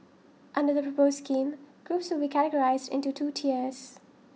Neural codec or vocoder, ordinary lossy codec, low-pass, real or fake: none; none; none; real